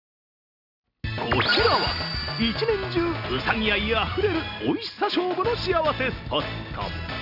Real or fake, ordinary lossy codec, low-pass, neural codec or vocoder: real; none; 5.4 kHz; none